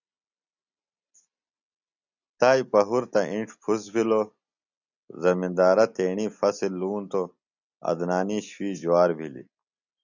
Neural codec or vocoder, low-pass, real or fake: none; 7.2 kHz; real